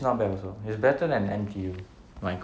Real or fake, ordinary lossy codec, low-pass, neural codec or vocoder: real; none; none; none